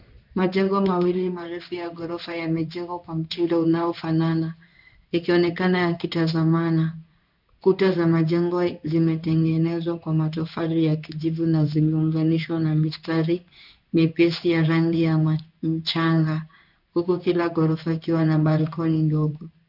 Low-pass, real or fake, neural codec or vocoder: 5.4 kHz; fake; codec, 16 kHz in and 24 kHz out, 1 kbps, XY-Tokenizer